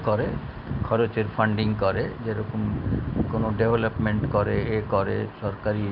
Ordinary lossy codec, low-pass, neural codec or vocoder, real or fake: Opus, 24 kbps; 5.4 kHz; none; real